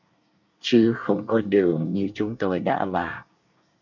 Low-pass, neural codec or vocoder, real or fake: 7.2 kHz; codec, 24 kHz, 1 kbps, SNAC; fake